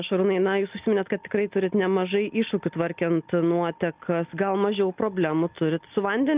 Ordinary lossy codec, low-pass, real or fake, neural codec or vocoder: Opus, 24 kbps; 3.6 kHz; real; none